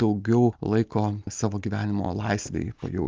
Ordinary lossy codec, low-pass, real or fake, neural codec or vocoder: Opus, 24 kbps; 7.2 kHz; real; none